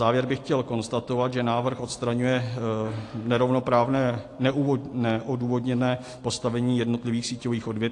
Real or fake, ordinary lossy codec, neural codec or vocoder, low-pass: real; AAC, 48 kbps; none; 10.8 kHz